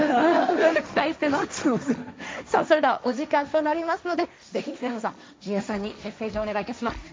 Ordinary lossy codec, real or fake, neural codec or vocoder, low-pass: none; fake; codec, 16 kHz, 1.1 kbps, Voila-Tokenizer; none